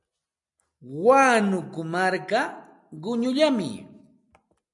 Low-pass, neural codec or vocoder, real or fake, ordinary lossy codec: 10.8 kHz; none; real; MP3, 96 kbps